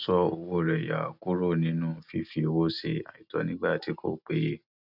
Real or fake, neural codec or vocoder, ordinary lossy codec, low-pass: real; none; none; 5.4 kHz